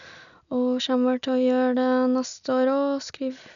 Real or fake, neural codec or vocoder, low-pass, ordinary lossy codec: real; none; 7.2 kHz; none